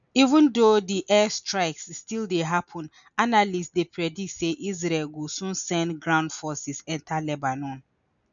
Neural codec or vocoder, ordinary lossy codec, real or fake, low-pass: none; none; real; 7.2 kHz